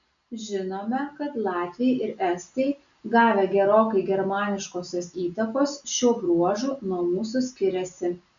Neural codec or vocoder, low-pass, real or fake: none; 7.2 kHz; real